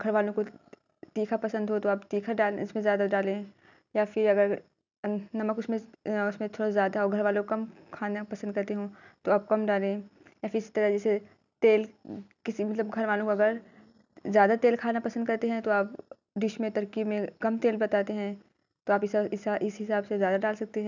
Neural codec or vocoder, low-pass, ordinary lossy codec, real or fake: none; 7.2 kHz; none; real